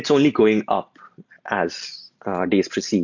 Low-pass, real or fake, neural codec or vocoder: 7.2 kHz; real; none